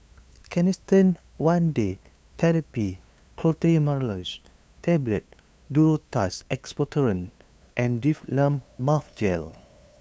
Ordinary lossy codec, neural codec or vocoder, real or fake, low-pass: none; codec, 16 kHz, 2 kbps, FunCodec, trained on LibriTTS, 25 frames a second; fake; none